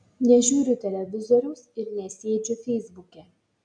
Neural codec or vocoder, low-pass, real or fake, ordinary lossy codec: none; 9.9 kHz; real; MP3, 64 kbps